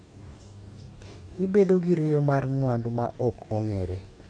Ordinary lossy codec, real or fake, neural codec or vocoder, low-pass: none; fake; codec, 44.1 kHz, 2.6 kbps, DAC; 9.9 kHz